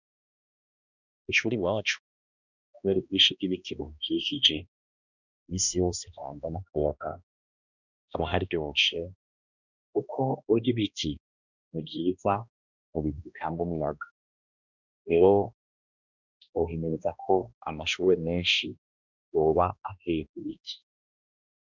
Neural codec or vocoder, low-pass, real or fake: codec, 16 kHz, 1 kbps, X-Codec, HuBERT features, trained on balanced general audio; 7.2 kHz; fake